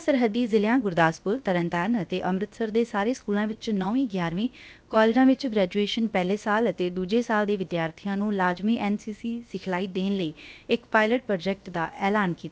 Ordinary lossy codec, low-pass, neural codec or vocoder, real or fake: none; none; codec, 16 kHz, about 1 kbps, DyCAST, with the encoder's durations; fake